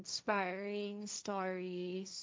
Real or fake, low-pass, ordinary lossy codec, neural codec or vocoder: fake; none; none; codec, 16 kHz, 1.1 kbps, Voila-Tokenizer